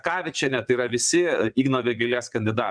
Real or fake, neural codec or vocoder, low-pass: fake; vocoder, 22.05 kHz, 80 mel bands, WaveNeXt; 9.9 kHz